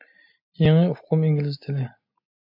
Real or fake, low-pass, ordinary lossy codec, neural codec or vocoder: real; 5.4 kHz; MP3, 48 kbps; none